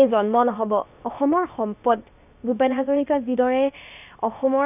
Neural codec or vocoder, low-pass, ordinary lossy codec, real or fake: codec, 16 kHz, about 1 kbps, DyCAST, with the encoder's durations; 3.6 kHz; none; fake